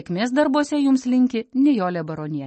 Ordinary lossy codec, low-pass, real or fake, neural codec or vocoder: MP3, 32 kbps; 10.8 kHz; real; none